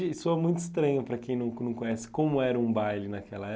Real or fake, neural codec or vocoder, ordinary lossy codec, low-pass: real; none; none; none